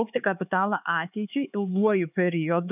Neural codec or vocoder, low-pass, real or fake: codec, 16 kHz, 4 kbps, X-Codec, HuBERT features, trained on LibriSpeech; 3.6 kHz; fake